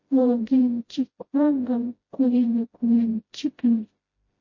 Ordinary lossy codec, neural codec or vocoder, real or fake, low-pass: MP3, 32 kbps; codec, 16 kHz, 0.5 kbps, FreqCodec, smaller model; fake; 7.2 kHz